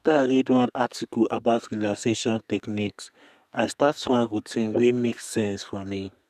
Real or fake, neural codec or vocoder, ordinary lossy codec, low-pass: fake; codec, 32 kHz, 1.9 kbps, SNAC; none; 14.4 kHz